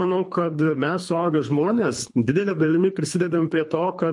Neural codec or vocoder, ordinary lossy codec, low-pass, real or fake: codec, 24 kHz, 3 kbps, HILCodec; MP3, 48 kbps; 10.8 kHz; fake